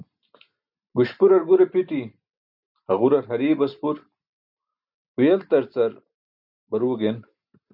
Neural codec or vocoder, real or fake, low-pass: none; real; 5.4 kHz